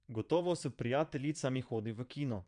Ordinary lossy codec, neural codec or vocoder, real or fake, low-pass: none; vocoder, 22.05 kHz, 80 mel bands, Vocos; fake; 9.9 kHz